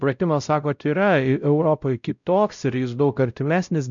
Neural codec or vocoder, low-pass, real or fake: codec, 16 kHz, 0.5 kbps, X-Codec, WavLM features, trained on Multilingual LibriSpeech; 7.2 kHz; fake